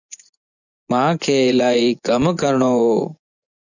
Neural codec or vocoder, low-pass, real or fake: vocoder, 24 kHz, 100 mel bands, Vocos; 7.2 kHz; fake